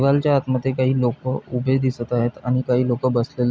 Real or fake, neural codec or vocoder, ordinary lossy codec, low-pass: real; none; none; none